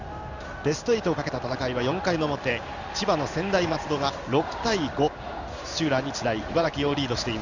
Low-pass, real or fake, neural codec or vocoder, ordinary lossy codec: 7.2 kHz; fake; codec, 16 kHz in and 24 kHz out, 1 kbps, XY-Tokenizer; none